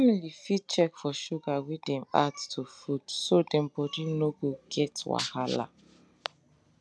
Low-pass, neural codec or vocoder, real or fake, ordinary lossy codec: none; none; real; none